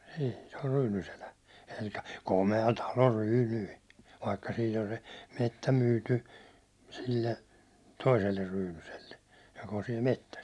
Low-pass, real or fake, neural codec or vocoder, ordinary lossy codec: none; real; none; none